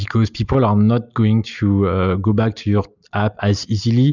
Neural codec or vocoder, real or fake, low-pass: none; real; 7.2 kHz